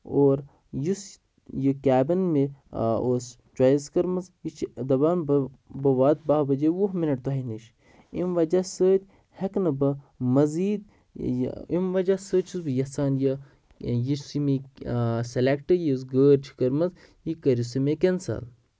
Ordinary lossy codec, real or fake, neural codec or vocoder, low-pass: none; real; none; none